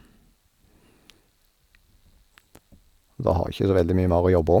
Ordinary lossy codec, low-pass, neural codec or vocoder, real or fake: none; 19.8 kHz; none; real